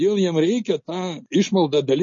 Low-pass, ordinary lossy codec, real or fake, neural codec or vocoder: 7.2 kHz; MP3, 32 kbps; real; none